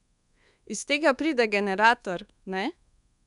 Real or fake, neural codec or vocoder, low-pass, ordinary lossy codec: fake; codec, 24 kHz, 1.2 kbps, DualCodec; 10.8 kHz; none